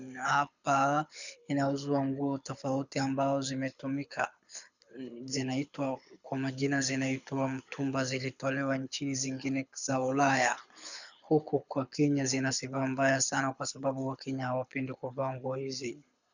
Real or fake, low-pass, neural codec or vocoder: fake; 7.2 kHz; codec, 24 kHz, 6 kbps, HILCodec